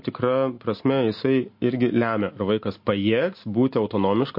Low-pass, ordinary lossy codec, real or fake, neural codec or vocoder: 5.4 kHz; MP3, 32 kbps; real; none